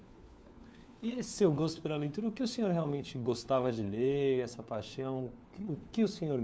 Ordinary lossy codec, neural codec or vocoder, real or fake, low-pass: none; codec, 16 kHz, 4 kbps, FunCodec, trained on LibriTTS, 50 frames a second; fake; none